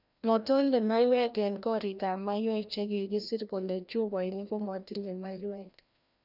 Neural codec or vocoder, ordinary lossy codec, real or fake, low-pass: codec, 16 kHz, 1 kbps, FreqCodec, larger model; none; fake; 5.4 kHz